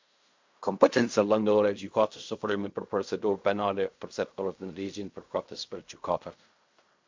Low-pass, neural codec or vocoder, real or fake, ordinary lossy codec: 7.2 kHz; codec, 16 kHz in and 24 kHz out, 0.4 kbps, LongCat-Audio-Codec, fine tuned four codebook decoder; fake; MP3, 64 kbps